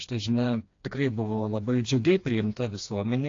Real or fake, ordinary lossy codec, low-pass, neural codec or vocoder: fake; AAC, 48 kbps; 7.2 kHz; codec, 16 kHz, 2 kbps, FreqCodec, smaller model